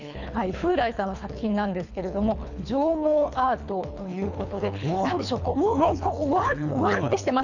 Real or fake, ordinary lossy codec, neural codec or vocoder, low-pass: fake; none; codec, 24 kHz, 3 kbps, HILCodec; 7.2 kHz